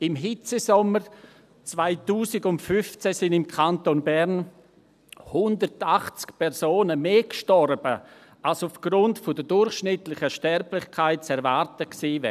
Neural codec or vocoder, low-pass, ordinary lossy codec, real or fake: none; 14.4 kHz; none; real